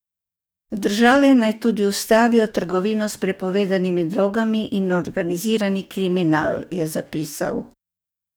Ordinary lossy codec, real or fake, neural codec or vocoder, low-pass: none; fake; codec, 44.1 kHz, 2.6 kbps, DAC; none